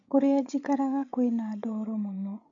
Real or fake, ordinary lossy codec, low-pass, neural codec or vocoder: fake; MP3, 48 kbps; 7.2 kHz; codec, 16 kHz, 8 kbps, FreqCodec, larger model